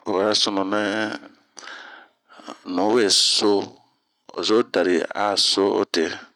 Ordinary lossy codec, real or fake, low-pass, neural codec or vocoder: none; real; 19.8 kHz; none